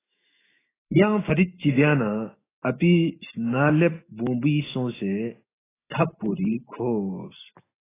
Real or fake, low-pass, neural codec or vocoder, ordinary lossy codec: fake; 3.6 kHz; autoencoder, 48 kHz, 128 numbers a frame, DAC-VAE, trained on Japanese speech; AAC, 16 kbps